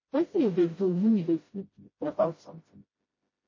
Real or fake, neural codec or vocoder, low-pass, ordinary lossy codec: fake; codec, 16 kHz, 0.5 kbps, FreqCodec, smaller model; 7.2 kHz; MP3, 32 kbps